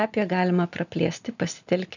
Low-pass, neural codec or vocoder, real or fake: 7.2 kHz; none; real